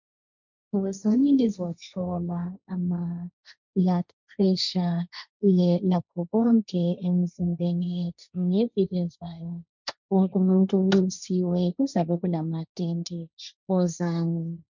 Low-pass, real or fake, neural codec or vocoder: 7.2 kHz; fake; codec, 16 kHz, 1.1 kbps, Voila-Tokenizer